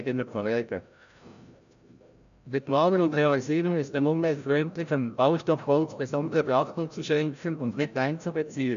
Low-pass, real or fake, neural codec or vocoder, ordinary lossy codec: 7.2 kHz; fake; codec, 16 kHz, 0.5 kbps, FreqCodec, larger model; none